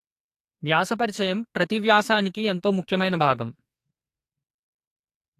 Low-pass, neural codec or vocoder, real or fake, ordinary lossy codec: 14.4 kHz; codec, 44.1 kHz, 2.6 kbps, SNAC; fake; AAC, 64 kbps